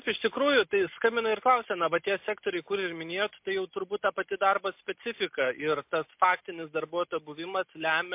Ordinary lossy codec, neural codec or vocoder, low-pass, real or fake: MP3, 32 kbps; none; 3.6 kHz; real